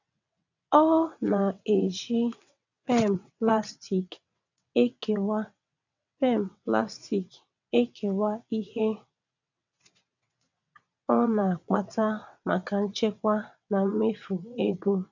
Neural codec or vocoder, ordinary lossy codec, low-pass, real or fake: vocoder, 22.05 kHz, 80 mel bands, WaveNeXt; none; 7.2 kHz; fake